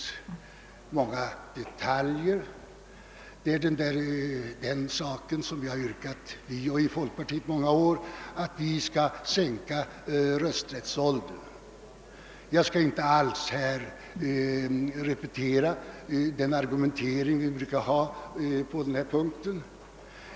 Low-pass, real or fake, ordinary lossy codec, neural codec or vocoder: none; real; none; none